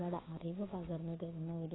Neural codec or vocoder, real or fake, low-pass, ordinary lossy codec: codec, 16 kHz, 6 kbps, DAC; fake; 7.2 kHz; AAC, 16 kbps